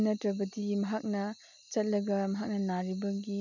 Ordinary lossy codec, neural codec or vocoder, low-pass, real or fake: none; none; 7.2 kHz; real